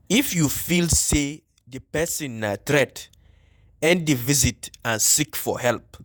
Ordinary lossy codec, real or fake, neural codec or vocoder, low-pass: none; fake; vocoder, 48 kHz, 128 mel bands, Vocos; none